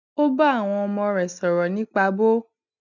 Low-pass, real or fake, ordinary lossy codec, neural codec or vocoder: 7.2 kHz; real; none; none